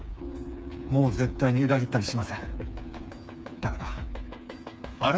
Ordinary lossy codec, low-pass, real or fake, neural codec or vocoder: none; none; fake; codec, 16 kHz, 4 kbps, FreqCodec, smaller model